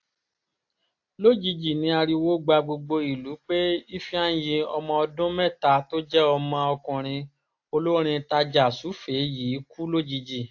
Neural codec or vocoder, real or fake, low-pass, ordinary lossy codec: none; real; 7.2 kHz; AAC, 48 kbps